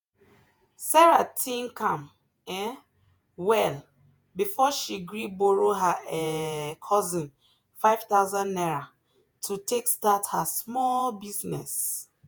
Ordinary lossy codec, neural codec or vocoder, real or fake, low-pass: none; vocoder, 48 kHz, 128 mel bands, Vocos; fake; none